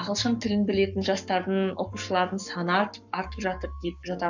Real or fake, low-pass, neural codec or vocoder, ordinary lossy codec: fake; 7.2 kHz; codec, 44.1 kHz, 7.8 kbps, Pupu-Codec; none